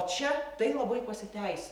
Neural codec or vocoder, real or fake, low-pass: vocoder, 44.1 kHz, 128 mel bands every 512 samples, BigVGAN v2; fake; 19.8 kHz